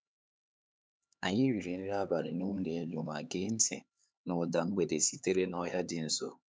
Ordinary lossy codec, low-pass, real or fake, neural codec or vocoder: none; none; fake; codec, 16 kHz, 4 kbps, X-Codec, HuBERT features, trained on LibriSpeech